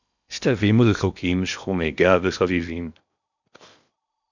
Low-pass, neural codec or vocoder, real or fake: 7.2 kHz; codec, 16 kHz in and 24 kHz out, 0.8 kbps, FocalCodec, streaming, 65536 codes; fake